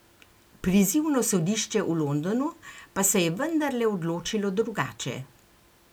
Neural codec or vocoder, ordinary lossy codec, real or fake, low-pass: none; none; real; none